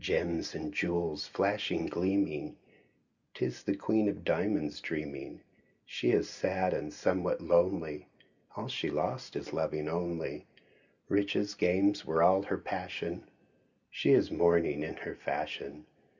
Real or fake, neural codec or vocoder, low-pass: real; none; 7.2 kHz